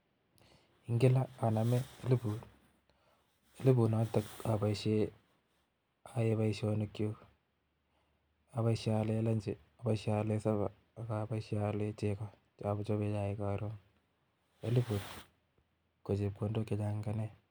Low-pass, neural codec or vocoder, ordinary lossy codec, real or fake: none; none; none; real